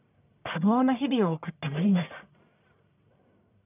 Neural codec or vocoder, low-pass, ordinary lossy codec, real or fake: codec, 44.1 kHz, 1.7 kbps, Pupu-Codec; 3.6 kHz; none; fake